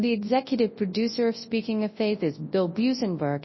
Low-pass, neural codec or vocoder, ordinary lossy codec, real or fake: 7.2 kHz; codec, 24 kHz, 0.9 kbps, WavTokenizer, large speech release; MP3, 24 kbps; fake